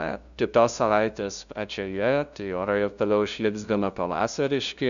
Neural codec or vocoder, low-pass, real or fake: codec, 16 kHz, 0.5 kbps, FunCodec, trained on LibriTTS, 25 frames a second; 7.2 kHz; fake